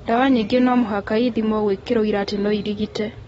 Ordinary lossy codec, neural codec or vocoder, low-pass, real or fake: AAC, 24 kbps; none; 19.8 kHz; real